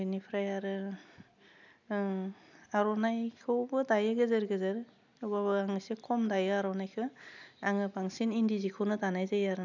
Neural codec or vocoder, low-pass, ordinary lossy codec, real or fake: none; 7.2 kHz; none; real